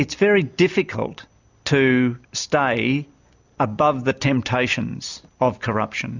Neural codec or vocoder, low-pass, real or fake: none; 7.2 kHz; real